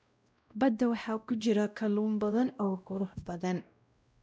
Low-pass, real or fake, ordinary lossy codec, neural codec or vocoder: none; fake; none; codec, 16 kHz, 0.5 kbps, X-Codec, WavLM features, trained on Multilingual LibriSpeech